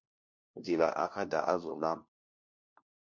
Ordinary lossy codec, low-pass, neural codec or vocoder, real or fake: MP3, 48 kbps; 7.2 kHz; codec, 16 kHz, 1 kbps, FunCodec, trained on LibriTTS, 50 frames a second; fake